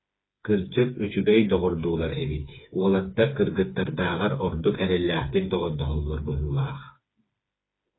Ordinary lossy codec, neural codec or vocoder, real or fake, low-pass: AAC, 16 kbps; codec, 16 kHz, 4 kbps, FreqCodec, smaller model; fake; 7.2 kHz